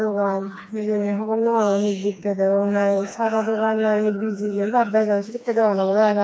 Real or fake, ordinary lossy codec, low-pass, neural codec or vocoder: fake; none; none; codec, 16 kHz, 2 kbps, FreqCodec, smaller model